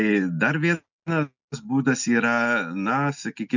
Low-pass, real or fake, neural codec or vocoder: 7.2 kHz; real; none